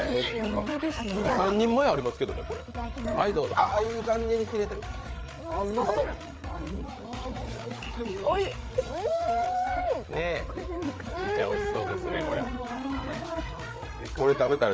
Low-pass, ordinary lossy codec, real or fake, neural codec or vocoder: none; none; fake; codec, 16 kHz, 8 kbps, FreqCodec, larger model